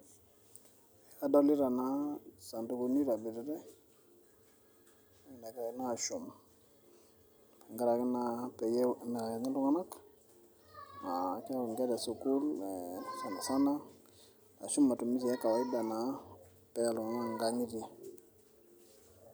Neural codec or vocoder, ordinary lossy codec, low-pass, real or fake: none; none; none; real